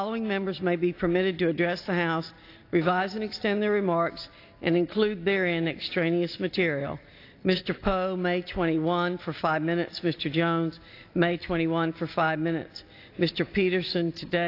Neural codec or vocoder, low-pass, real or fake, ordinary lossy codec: none; 5.4 kHz; real; AAC, 32 kbps